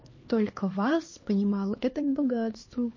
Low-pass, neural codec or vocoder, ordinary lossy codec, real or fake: 7.2 kHz; codec, 16 kHz, 2 kbps, X-Codec, HuBERT features, trained on LibriSpeech; MP3, 32 kbps; fake